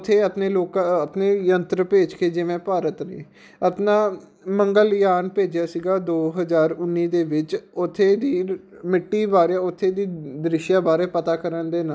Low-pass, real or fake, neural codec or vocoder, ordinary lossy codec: none; real; none; none